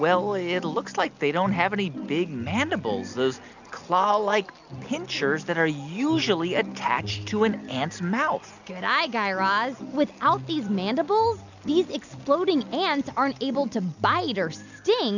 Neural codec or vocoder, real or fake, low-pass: none; real; 7.2 kHz